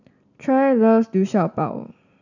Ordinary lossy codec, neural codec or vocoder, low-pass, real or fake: none; none; 7.2 kHz; real